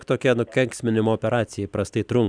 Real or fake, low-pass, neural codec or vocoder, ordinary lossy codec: real; 9.9 kHz; none; Opus, 64 kbps